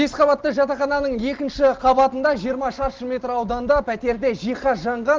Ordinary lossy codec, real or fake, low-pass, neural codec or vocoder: Opus, 16 kbps; real; 7.2 kHz; none